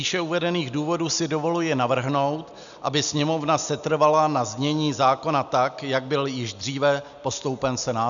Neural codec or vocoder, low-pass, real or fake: none; 7.2 kHz; real